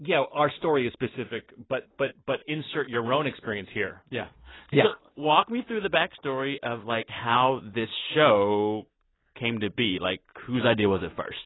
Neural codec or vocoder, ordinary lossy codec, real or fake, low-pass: none; AAC, 16 kbps; real; 7.2 kHz